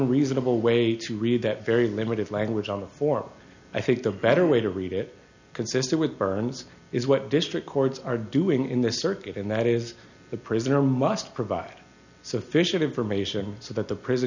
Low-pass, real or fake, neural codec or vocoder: 7.2 kHz; real; none